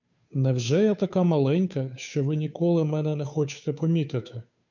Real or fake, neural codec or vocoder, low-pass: fake; codec, 16 kHz, 2 kbps, FunCodec, trained on Chinese and English, 25 frames a second; 7.2 kHz